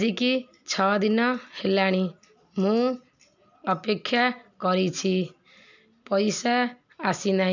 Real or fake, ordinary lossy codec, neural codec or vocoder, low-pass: real; none; none; 7.2 kHz